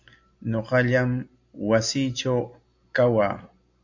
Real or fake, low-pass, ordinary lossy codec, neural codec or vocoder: real; 7.2 kHz; MP3, 48 kbps; none